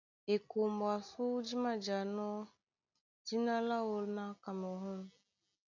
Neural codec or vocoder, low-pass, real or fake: none; 7.2 kHz; real